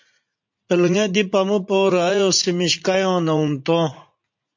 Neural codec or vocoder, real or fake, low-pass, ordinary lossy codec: vocoder, 44.1 kHz, 80 mel bands, Vocos; fake; 7.2 kHz; MP3, 48 kbps